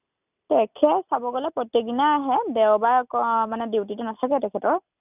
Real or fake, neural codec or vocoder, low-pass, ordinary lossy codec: real; none; 3.6 kHz; none